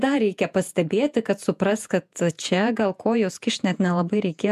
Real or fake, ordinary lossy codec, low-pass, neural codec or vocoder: fake; MP3, 96 kbps; 14.4 kHz; vocoder, 48 kHz, 128 mel bands, Vocos